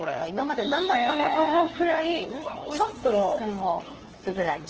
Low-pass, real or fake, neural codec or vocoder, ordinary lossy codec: 7.2 kHz; fake; codec, 16 kHz, 2 kbps, FunCodec, trained on LibriTTS, 25 frames a second; Opus, 16 kbps